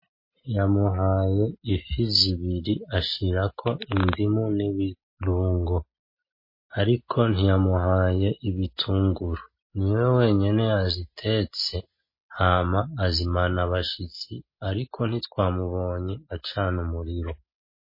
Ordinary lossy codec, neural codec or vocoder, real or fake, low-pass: MP3, 24 kbps; none; real; 5.4 kHz